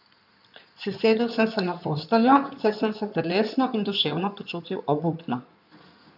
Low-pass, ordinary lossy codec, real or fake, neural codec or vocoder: 5.4 kHz; none; fake; codec, 16 kHz in and 24 kHz out, 2.2 kbps, FireRedTTS-2 codec